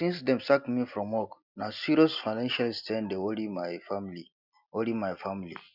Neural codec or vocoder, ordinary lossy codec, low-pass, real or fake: none; none; 5.4 kHz; real